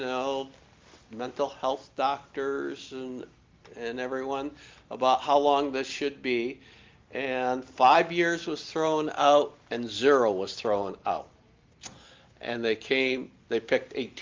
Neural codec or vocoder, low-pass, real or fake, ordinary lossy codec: none; 7.2 kHz; real; Opus, 32 kbps